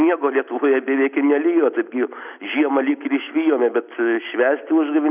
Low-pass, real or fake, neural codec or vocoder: 3.6 kHz; real; none